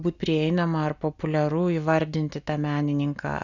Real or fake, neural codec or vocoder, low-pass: real; none; 7.2 kHz